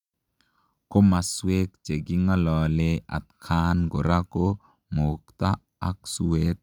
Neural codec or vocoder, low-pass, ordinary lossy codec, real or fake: none; 19.8 kHz; none; real